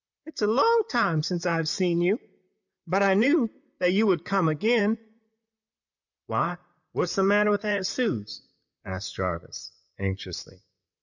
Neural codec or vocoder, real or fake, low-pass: vocoder, 44.1 kHz, 128 mel bands, Pupu-Vocoder; fake; 7.2 kHz